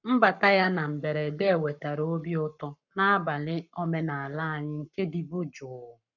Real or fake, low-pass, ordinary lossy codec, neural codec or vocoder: fake; 7.2 kHz; none; codec, 44.1 kHz, 7.8 kbps, Pupu-Codec